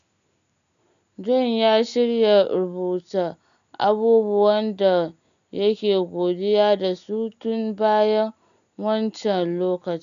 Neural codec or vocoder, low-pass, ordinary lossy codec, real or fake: none; 7.2 kHz; none; real